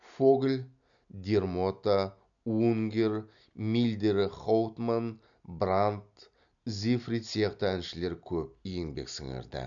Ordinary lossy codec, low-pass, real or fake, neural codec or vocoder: none; 7.2 kHz; real; none